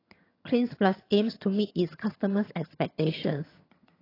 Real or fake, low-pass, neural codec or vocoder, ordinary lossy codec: fake; 5.4 kHz; vocoder, 22.05 kHz, 80 mel bands, HiFi-GAN; AAC, 24 kbps